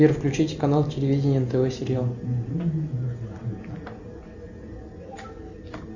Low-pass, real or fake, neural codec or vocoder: 7.2 kHz; real; none